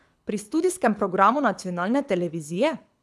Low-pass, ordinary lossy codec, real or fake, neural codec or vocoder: none; none; fake; codec, 24 kHz, 6 kbps, HILCodec